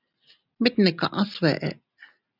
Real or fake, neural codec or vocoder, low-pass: real; none; 5.4 kHz